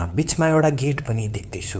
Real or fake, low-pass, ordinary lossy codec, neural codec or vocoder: fake; none; none; codec, 16 kHz, 2 kbps, FunCodec, trained on LibriTTS, 25 frames a second